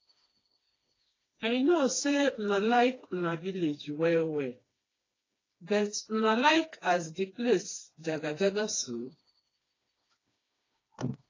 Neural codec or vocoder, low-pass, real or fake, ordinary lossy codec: codec, 16 kHz, 2 kbps, FreqCodec, smaller model; 7.2 kHz; fake; AAC, 32 kbps